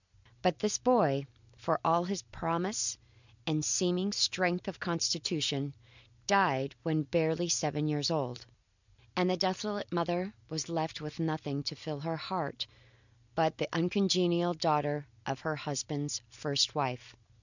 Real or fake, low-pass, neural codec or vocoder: real; 7.2 kHz; none